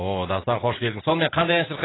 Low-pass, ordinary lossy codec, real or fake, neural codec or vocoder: 7.2 kHz; AAC, 16 kbps; real; none